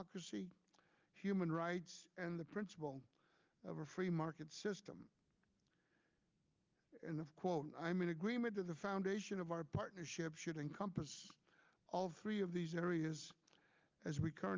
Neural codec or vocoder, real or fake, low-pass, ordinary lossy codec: none; real; 7.2 kHz; Opus, 24 kbps